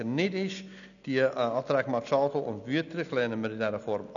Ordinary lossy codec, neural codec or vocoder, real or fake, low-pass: none; none; real; 7.2 kHz